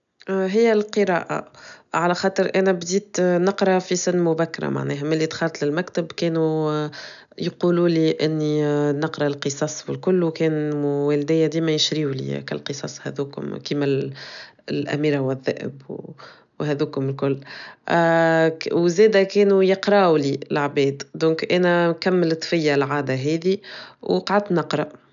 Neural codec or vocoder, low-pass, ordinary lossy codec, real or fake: none; 7.2 kHz; none; real